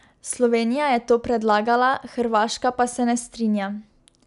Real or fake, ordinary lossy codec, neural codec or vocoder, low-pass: real; none; none; 10.8 kHz